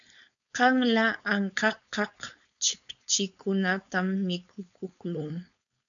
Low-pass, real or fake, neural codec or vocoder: 7.2 kHz; fake; codec, 16 kHz, 4.8 kbps, FACodec